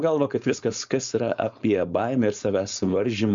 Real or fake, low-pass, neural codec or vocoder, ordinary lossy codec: fake; 7.2 kHz; codec, 16 kHz, 4.8 kbps, FACodec; Opus, 64 kbps